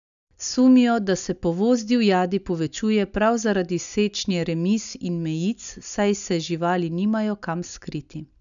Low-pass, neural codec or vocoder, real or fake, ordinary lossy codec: 7.2 kHz; none; real; none